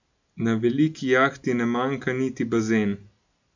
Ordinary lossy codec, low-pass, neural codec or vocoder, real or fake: none; 7.2 kHz; none; real